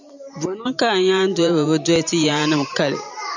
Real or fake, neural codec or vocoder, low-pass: fake; vocoder, 44.1 kHz, 128 mel bands every 512 samples, BigVGAN v2; 7.2 kHz